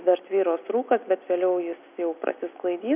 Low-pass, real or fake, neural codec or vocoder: 3.6 kHz; real; none